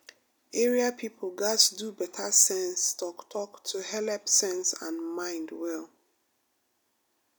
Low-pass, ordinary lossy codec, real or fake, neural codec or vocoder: none; none; real; none